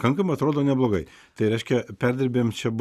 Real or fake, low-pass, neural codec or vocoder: real; 14.4 kHz; none